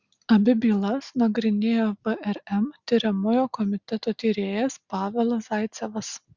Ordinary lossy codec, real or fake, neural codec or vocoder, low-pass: Opus, 64 kbps; real; none; 7.2 kHz